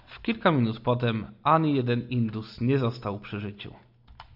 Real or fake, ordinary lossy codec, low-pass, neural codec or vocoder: real; AAC, 48 kbps; 5.4 kHz; none